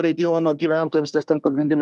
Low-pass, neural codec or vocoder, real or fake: 10.8 kHz; codec, 24 kHz, 1 kbps, SNAC; fake